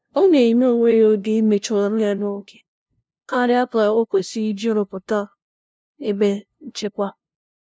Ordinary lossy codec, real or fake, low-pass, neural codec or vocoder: none; fake; none; codec, 16 kHz, 0.5 kbps, FunCodec, trained on LibriTTS, 25 frames a second